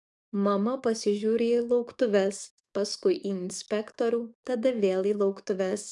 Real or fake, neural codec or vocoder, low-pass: fake; codec, 44.1 kHz, 7.8 kbps, DAC; 10.8 kHz